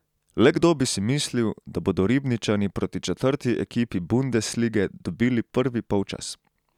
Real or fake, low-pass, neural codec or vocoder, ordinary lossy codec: real; 19.8 kHz; none; none